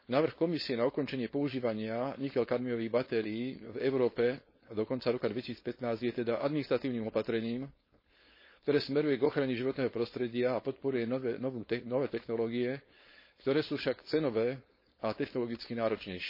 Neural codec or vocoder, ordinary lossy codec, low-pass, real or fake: codec, 16 kHz, 4.8 kbps, FACodec; MP3, 24 kbps; 5.4 kHz; fake